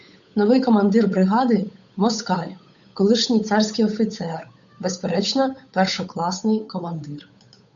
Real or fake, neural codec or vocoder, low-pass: fake; codec, 16 kHz, 8 kbps, FunCodec, trained on Chinese and English, 25 frames a second; 7.2 kHz